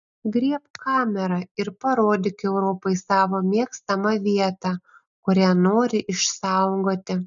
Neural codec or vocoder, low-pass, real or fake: none; 7.2 kHz; real